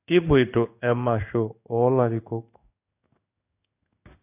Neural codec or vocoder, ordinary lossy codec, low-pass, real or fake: codec, 16 kHz in and 24 kHz out, 1 kbps, XY-Tokenizer; AAC, 32 kbps; 3.6 kHz; fake